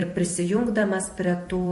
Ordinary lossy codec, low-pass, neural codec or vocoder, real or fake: MP3, 48 kbps; 14.4 kHz; vocoder, 44.1 kHz, 128 mel bands every 256 samples, BigVGAN v2; fake